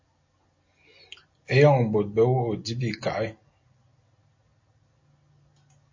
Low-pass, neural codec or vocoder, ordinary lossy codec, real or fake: 7.2 kHz; none; MP3, 32 kbps; real